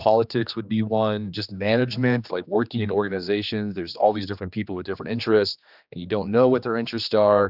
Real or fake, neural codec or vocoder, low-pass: fake; codec, 16 kHz, 2 kbps, X-Codec, HuBERT features, trained on general audio; 5.4 kHz